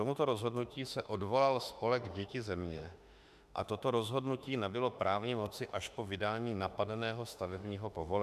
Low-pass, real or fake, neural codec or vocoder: 14.4 kHz; fake; autoencoder, 48 kHz, 32 numbers a frame, DAC-VAE, trained on Japanese speech